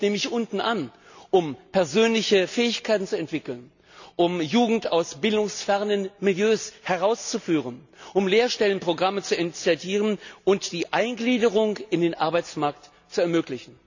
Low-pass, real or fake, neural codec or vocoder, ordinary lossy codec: 7.2 kHz; real; none; none